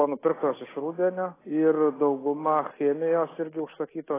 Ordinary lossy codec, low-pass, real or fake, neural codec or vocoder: AAC, 16 kbps; 3.6 kHz; real; none